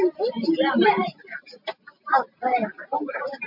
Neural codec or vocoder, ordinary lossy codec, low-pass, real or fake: none; MP3, 48 kbps; 5.4 kHz; real